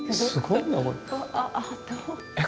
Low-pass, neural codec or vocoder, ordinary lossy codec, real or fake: none; none; none; real